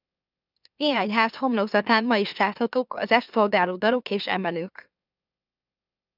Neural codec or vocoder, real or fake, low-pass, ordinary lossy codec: autoencoder, 44.1 kHz, a latent of 192 numbers a frame, MeloTTS; fake; 5.4 kHz; AAC, 48 kbps